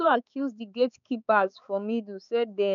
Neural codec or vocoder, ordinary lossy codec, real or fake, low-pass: codec, 16 kHz, 4 kbps, X-Codec, HuBERT features, trained on balanced general audio; none; fake; 7.2 kHz